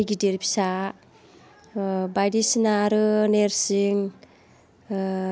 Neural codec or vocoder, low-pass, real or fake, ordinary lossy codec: none; none; real; none